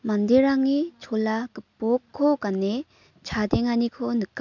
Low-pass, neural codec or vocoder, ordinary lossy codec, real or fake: 7.2 kHz; none; none; real